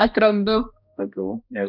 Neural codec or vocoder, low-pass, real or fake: codec, 16 kHz, 1 kbps, X-Codec, HuBERT features, trained on balanced general audio; 5.4 kHz; fake